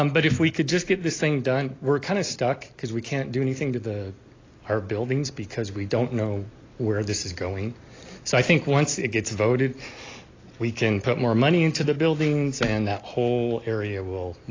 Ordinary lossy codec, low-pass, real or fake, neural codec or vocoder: AAC, 32 kbps; 7.2 kHz; real; none